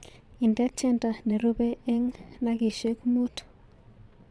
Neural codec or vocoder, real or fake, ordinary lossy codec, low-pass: vocoder, 22.05 kHz, 80 mel bands, Vocos; fake; none; none